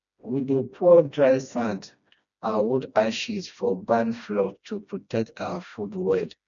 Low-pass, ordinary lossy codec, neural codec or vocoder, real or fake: 7.2 kHz; none; codec, 16 kHz, 1 kbps, FreqCodec, smaller model; fake